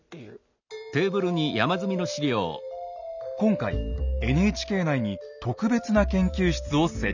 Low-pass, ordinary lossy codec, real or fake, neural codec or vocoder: 7.2 kHz; none; real; none